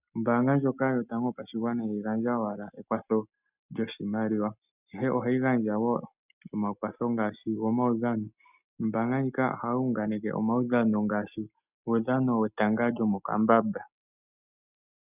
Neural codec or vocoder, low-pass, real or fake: none; 3.6 kHz; real